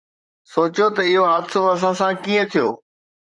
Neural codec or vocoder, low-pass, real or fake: vocoder, 44.1 kHz, 128 mel bands, Pupu-Vocoder; 10.8 kHz; fake